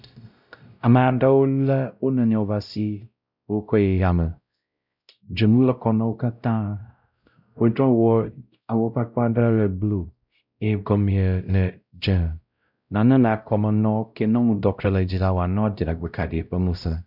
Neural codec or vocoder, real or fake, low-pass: codec, 16 kHz, 0.5 kbps, X-Codec, WavLM features, trained on Multilingual LibriSpeech; fake; 5.4 kHz